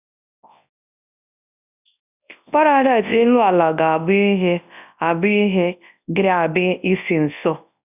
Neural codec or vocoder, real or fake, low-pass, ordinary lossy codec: codec, 24 kHz, 0.9 kbps, WavTokenizer, large speech release; fake; 3.6 kHz; none